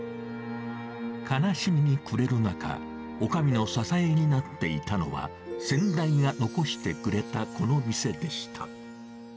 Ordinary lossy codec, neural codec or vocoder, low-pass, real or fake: none; none; none; real